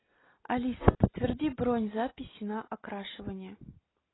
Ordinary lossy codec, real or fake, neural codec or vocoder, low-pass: AAC, 16 kbps; real; none; 7.2 kHz